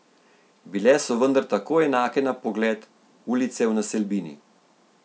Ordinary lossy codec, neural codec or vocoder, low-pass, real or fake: none; none; none; real